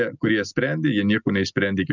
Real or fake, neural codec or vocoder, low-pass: real; none; 7.2 kHz